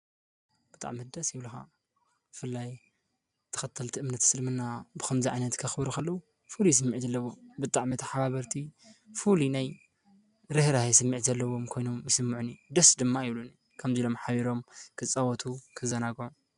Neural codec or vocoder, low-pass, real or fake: none; 10.8 kHz; real